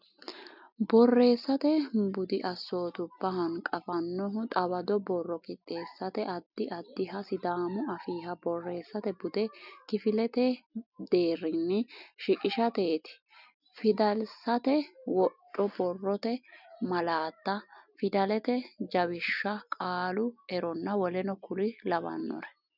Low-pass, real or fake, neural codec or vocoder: 5.4 kHz; real; none